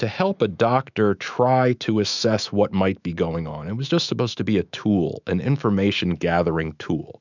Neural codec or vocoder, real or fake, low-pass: autoencoder, 48 kHz, 128 numbers a frame, DAC-VAE, trained on Japanese speech; fake; 7.2 kHz